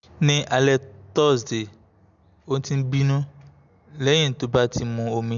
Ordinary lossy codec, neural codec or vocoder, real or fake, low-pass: none; none; real; 7.2 kHz